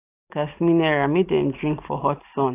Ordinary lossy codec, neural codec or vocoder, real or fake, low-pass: AAC, 24 kbps; none; real; 3.6 kHz